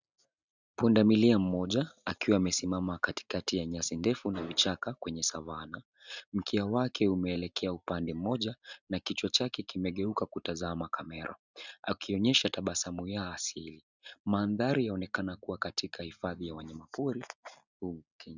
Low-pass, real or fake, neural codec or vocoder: 7.2 kHz; real; none